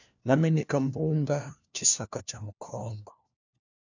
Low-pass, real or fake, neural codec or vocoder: 7.2 kHz; fake; codec, 16 kHz, 1 kbps, FunCodec, trained on LibriTTS, 50 frames a second